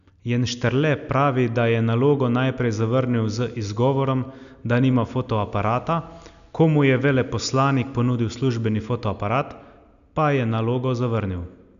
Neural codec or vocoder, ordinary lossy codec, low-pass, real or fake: none; none; 7.2 kHz; real